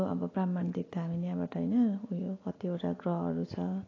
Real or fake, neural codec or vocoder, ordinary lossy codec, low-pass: real; none; AAC, 32 kbps; 7.2 kHz